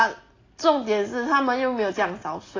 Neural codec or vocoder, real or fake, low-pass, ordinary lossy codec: none; real; 7.2 kHz; AAC, 32 kbps